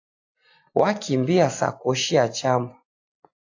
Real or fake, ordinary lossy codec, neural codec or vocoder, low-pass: real; AAC, 48 kbps; none; 7.2 kHz